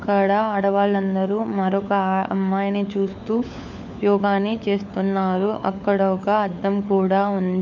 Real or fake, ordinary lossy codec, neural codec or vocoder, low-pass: fake; none; codec, 16 kHz, 4 kbps, FunCodec, trained on LibriTTS, 50 frames a second; 7.2 kHz